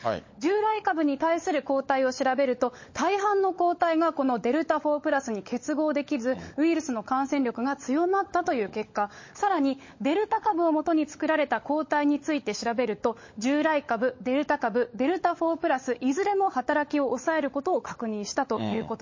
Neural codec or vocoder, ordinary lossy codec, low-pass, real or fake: codec, 16 kHz, 16 kbps, FunCodec, trained on LibriTTS, 50 frames a second; MP3, 32 kbps; 7.2 kHz; fake